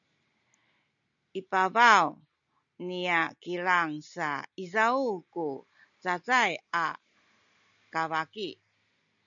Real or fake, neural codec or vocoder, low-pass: real; none; 7.2 kHz